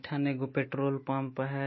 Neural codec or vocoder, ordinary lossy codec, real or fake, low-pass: autoencoder, 48 kHz, 128 numbers a frame, DAC-VAE, trained on Japanese speech; MP3, 24 kbps; fake; 7.2 kHz